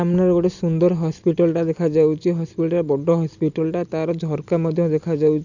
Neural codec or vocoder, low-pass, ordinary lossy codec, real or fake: none; 7.2 kHz; none; real